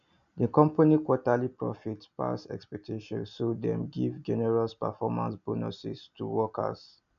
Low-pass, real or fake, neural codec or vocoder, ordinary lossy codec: 7.2 kHz; real; none; none